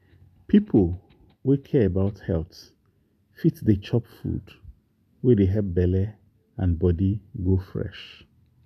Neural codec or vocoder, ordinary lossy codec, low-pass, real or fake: none; none; 14.4 kHz; real